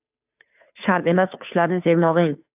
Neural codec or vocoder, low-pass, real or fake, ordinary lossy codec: codec, 16 kHz, 2 kbps, FunCodec, trained on Chinese and English, 25 frames a second; 3.6 kHz; fake; AAC, 32 kbps